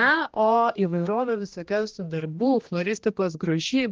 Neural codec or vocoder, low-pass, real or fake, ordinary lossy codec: codec, 16 kHz, 1 kbps, X-Codec, HuBERT features, trained on general audio; 7.2 kHz; fake; Opus, 32 kbps